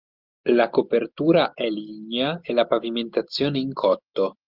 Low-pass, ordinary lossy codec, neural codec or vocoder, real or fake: 5.4 kHz; Opus, 32 kbps; none; real